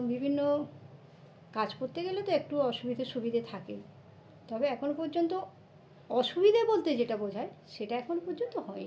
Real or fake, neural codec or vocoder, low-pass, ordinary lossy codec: real; none; none; none